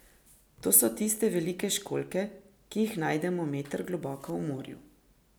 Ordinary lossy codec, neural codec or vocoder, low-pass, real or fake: none; none; none; real